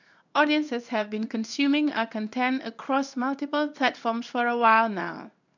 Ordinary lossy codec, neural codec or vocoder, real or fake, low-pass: none; codec, 16 kHz in and 24 kHz out, 1 kbps, XY-Tokenizer; fake; 7.2 kHz